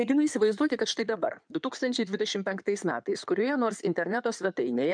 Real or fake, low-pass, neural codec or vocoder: fake; 9.9 kHz; codec, 16 kHz in and 24 kHz out, 2.2 kbps, FireRedTTS-2 codec